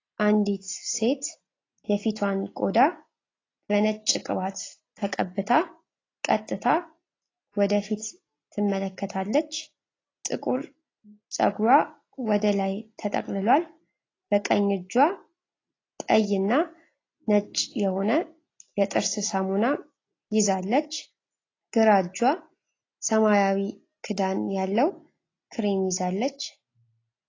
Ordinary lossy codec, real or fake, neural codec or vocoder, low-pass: AAC, 32 kbps; real; none; 7.2 kHz